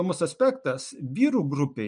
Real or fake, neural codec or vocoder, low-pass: real; none; 9.9 kHz